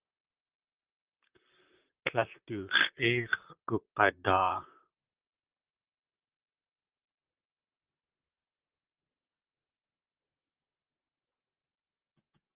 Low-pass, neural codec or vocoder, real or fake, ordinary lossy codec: 3.6 kHz; vocoder, 44.1 kHz, 128 mel bands, Pupu-Vocoder; fake; Opus, 32 kbps